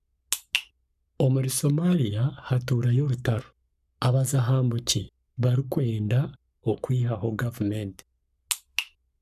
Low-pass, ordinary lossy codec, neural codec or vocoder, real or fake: 14.4 kHz; none; codec, 44.1 kHz, 7.8 kbps, Pupu-Codec; fake